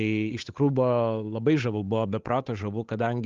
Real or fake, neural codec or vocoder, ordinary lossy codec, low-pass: fake; codec, 16 kHz, 8 kbps, FunCodec, trained on Chinese and English, 25 frames a second; Opus, 32 kbps; 7.2 kHz